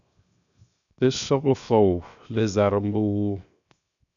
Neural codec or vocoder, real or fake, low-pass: codec, 16 kHz, 0.7 kbps, FocalCodec; fake; 7.2 kHz